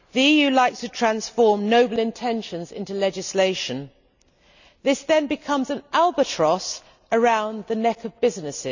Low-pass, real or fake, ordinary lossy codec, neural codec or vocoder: 7.2 kHz; real; none; none